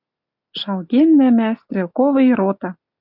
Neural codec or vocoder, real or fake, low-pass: none; real; 5.4 kHz